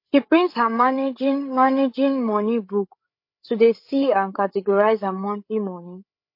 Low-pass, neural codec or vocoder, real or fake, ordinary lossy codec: 5.4 kHz; codec, 16 kHz, 8 kbps, FreqCodec, larger model; fake; MP3, 32 kbps